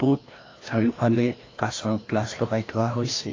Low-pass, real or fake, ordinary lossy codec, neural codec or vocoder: 7.2 kHz; fake; AAC, 32 kbps; codec, 16 kHz, 1 kbps, FreqCodec, larger model